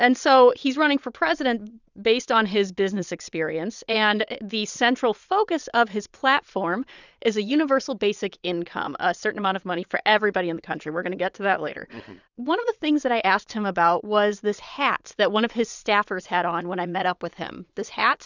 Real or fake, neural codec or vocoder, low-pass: fake; vocoder, 22.05 kHz, 80 mel bands, Vocos; 7.2 kHz